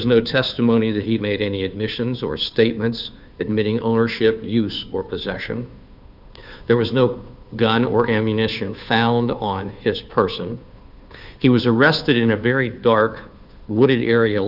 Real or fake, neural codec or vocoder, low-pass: fake; codec, 16 kHz, 2 kbps, FunCodec, trained on Chinese and English, 25 frames a second; 5.4 kHz